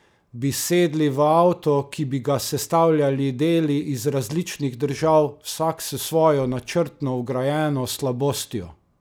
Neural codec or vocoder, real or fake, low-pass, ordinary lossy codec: none; real; none; none